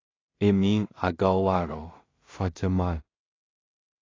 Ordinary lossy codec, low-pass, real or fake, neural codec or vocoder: AAC, 32 kbps; 7.2 kHz; fake; codec, 16 kHz in and 24 kHz out, 0.4 kbps, LongCat-Audio-Codec, two codebook decoder